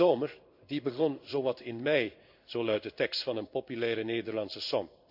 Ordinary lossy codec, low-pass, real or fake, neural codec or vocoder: none; 5.4 kHz; fake; codec, 16 kHz in and 24 kHz out, 1 kbps, XY-Tokenizer